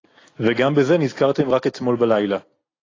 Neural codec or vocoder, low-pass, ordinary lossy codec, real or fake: none; 7.2 kHz; AAC, 32 kbps; real